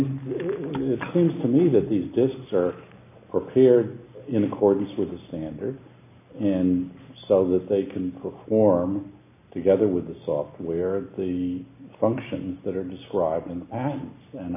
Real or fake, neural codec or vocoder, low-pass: real; none; 3.6 kHz